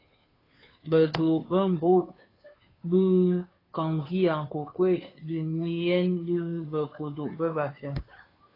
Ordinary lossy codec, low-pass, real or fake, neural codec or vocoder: AAC, 24 kbps; 5.4 kHz; fake; codec, 16 kHz, 2 kbps, FunCodec, trained on Chinese and English, 25 frames a second